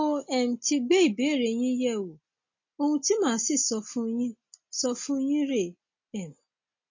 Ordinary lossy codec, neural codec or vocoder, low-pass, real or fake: MP3, 32 kbps; none; 7.2 kHz; real